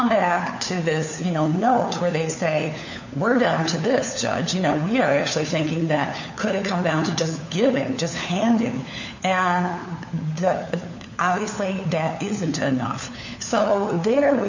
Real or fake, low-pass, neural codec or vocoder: fake; 7.2 kHz; codec, 16 kHz, 4 kbps, FunCodec, trained on LibriTTS, 50 frames a second